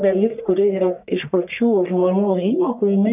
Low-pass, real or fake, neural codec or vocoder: 3.6 kHz; fake; codec, 44.1 kHz, 1.7 kbps, Pupu-Codec